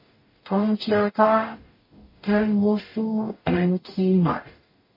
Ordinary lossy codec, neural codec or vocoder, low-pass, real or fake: MP3, 24 kbps; codec, 44.1 kHz, 0.9 kbps, DAC; 5.4 kHz; fake